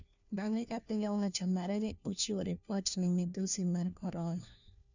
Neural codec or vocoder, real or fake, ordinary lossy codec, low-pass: codec, 16 kHz, 1 kbps, FunCodec, trained on LibriTTS, 50 frames a second; fake; none; 7.2 kHz